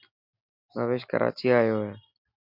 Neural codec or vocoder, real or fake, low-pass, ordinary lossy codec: none; real; 5.4 kHz; MP3, 48 kbps